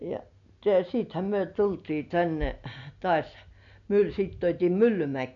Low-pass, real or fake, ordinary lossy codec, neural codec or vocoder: 7.2 kHz; real; none; none